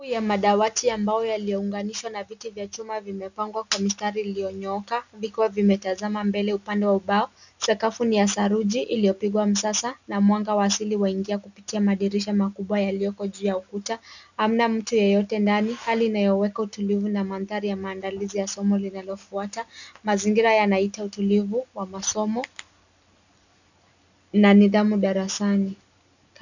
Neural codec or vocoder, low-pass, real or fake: none; 7.2 kHz; real